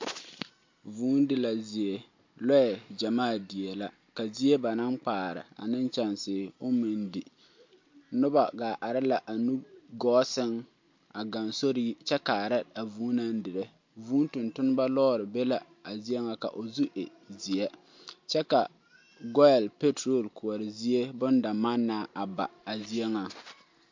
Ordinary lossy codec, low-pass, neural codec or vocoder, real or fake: MP3, 48 kbps; 7.2 kHz; none; real